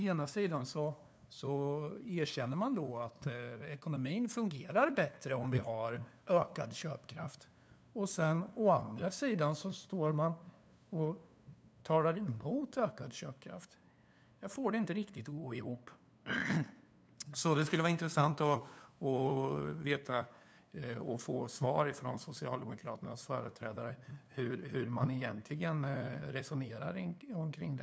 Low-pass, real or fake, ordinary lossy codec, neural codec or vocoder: none; fake; none; codec, 16 kHz, 2 kbps, FunCodec, trained on LibriTTS, 25 frames a second